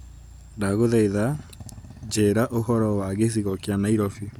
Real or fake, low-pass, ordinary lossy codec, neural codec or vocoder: real; 19.8 kHz; none; none